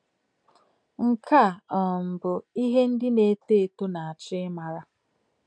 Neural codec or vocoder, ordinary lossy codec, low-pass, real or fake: none; none; 9.9 kHz; real